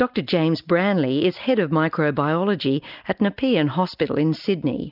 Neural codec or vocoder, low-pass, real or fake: none; 5.4 kHz; real